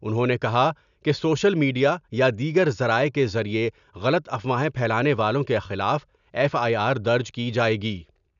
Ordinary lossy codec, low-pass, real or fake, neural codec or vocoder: none; 7.2 kHz; real; none